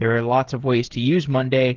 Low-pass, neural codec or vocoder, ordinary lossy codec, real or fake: 7.2 kHz; codec, 16 kHz, 8 kbps, FreqCodec, smaller model; Opus, 16 kbps; fake